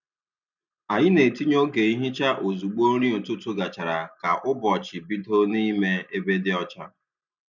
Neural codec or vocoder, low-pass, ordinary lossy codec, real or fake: none; 7.2 kHz; none; real